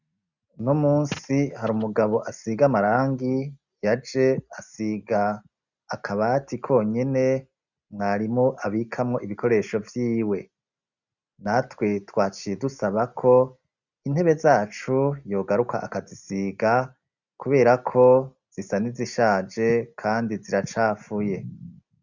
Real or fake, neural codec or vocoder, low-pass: real; none; 7.2 kHz